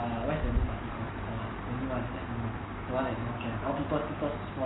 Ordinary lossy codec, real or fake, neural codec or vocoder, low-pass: AAC, 16 kbps; real; none; 7.2 kHz